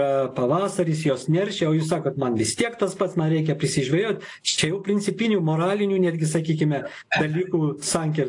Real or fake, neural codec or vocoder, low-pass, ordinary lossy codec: real; none; 10.8 kHz; AAC, 48 kbps